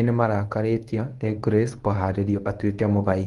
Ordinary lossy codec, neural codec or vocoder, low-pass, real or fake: Opus, 24 kbps; codec, 24 kHz, 0.9 kbps, WavTokenizer, medium speech release version 1; 10.8 kHz; fake